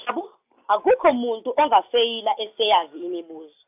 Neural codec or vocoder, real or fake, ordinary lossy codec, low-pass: none; real; AAC, 32 kbps; 3.6 kHz